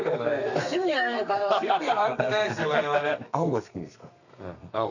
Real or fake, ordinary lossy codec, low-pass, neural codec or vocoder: fake; none; 7.2 kHz; codec, 32 kHz, 1.9 kbps, SNAC